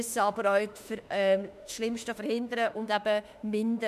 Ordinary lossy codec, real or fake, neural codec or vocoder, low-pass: none; fake; autoencoder, 48 kHz, 32 numbers a frame, DAC-VAE, trained on Japanese speech; 14.4 kHz